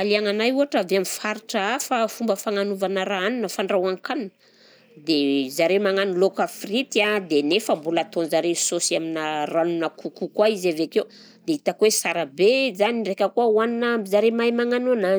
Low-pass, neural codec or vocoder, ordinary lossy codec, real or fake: none; none; none; real